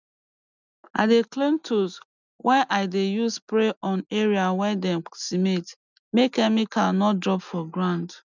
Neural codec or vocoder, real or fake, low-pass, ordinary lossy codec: none; real; 7.2 kHz; none